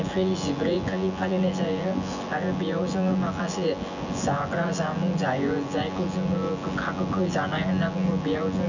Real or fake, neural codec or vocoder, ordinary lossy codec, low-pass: fake; vocoder, 24 kHz, 100 mel bands, Vocos; none; 7.2 kHz